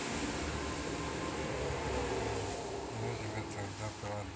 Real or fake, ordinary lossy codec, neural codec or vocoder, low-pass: real; none; none; none